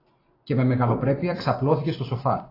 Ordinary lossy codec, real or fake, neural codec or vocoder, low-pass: AAC, 24 kbps; real; none; 5.4 kHz